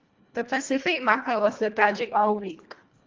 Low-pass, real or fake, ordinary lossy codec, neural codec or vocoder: 7.2 kHz; fake; Opus, 32 kbps; codec, 24 kHz, 1.5 kbps, HILCodec